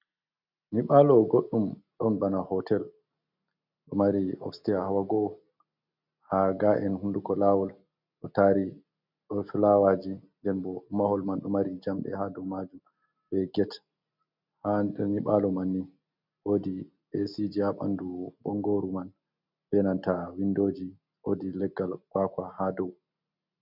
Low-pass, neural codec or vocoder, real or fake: 5.4 kHz; none; real